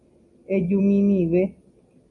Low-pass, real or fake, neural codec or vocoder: 10.8 kHz; real; none